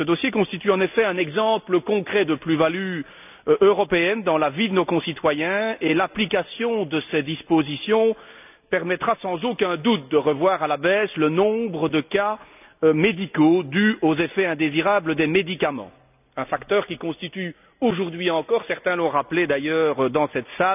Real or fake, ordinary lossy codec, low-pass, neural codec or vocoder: real; none; 3.6 kHz; none